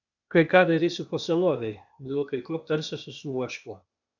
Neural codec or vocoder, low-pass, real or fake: codec, 16 kHz, 0.8 kbps, ZipCodec; 7.2 kHz; fake